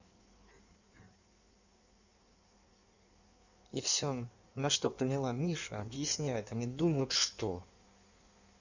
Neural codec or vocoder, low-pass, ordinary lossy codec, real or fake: codec, 16 kHz in and 24 kHz out, 1.1 kbps, FireRedTTS-2 codec; 7.2 kHz; none; fake